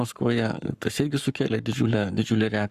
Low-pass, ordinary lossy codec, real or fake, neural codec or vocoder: 14.4 kHz; Opus, 64 kbps; fake; codec, 44.1 kHz, 7.8 kbps, Pupu-Codec